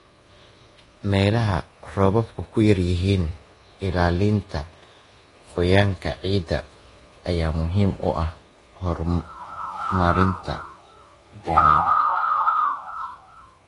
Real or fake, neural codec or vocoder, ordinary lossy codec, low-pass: fake; codec, 24 kHz, 1.2 kbps, DualCodec; AAC, 32 kbps; 10.8 kHz